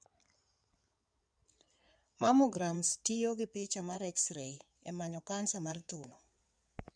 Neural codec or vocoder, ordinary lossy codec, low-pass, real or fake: codec, 16 kHz in and 24 kHz out, 2.2 kbps, FireRedTTS-2 codec; none; 9.9 kHz; fake